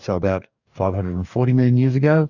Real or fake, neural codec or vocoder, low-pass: fake; codec, 44.1 kHz, 2.6 kbps, DAC; 7.2 kHz